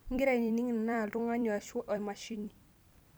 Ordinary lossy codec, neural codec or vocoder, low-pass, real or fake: none; vocoder, 44.1 kHz, 128 mel bands every 256 samples, BigVGAN v2; none; fake